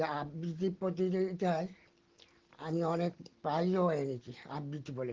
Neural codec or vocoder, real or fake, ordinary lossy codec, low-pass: none; real; Opus, 16 kbps; 7.2 kHz